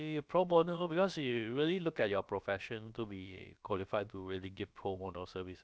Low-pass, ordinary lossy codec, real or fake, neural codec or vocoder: none; none; fake; codec, 16 kHz, about 1 kbps, DyCAST, with the encoder's durations